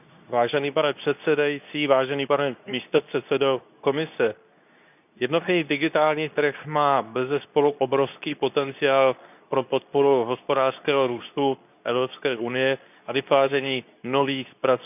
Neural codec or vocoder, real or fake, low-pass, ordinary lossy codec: codec, 24 kHz, 0.9 kbps, WavTokenizer, medium speech release version 2; fake; 3.6 kHz; none